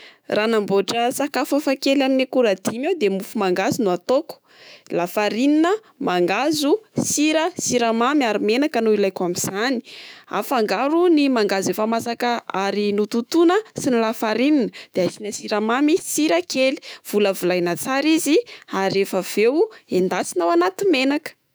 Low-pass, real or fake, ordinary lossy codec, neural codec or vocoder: none; fake; none; autoencoder, 48 kHz, 128 numbers a frame, DAC-VAE, trained on Japanese speech